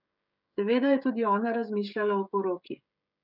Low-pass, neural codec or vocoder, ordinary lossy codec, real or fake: 5.4 kHz; codec, 16 kHz, 16 kbps, FreqCodec, smaller model; none; fake